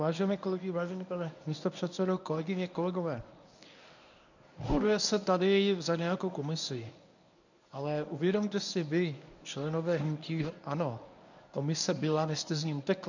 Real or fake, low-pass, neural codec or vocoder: fake; 7.2 kHz; codec, 24 kHz, 0.9 kbps, WavTokenizer, medium speech release version 1